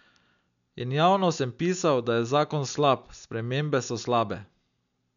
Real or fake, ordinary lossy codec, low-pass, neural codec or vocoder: real; none; 7.2 kHz; none